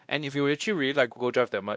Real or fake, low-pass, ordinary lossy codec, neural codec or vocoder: fake; none; none; codec, 16 kHz, 1 kbps, X-Codec, WavLM features, trained on Multilingual LibriSpeech